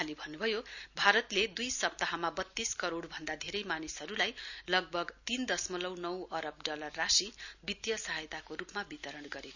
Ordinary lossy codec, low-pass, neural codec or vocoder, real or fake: none; 7.2 kHz; none; real